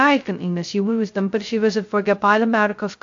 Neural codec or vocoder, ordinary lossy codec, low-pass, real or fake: codec, 16 kHz, 0.2 kbps, FocalCodec; AAC, 64 kbps; 7.2 kHz; fake